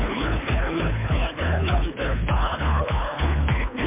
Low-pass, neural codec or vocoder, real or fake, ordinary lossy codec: 3.6 kHz; codec, 24 kHz, 3 kbps, HILCodec; fake; MP3, 32 kbps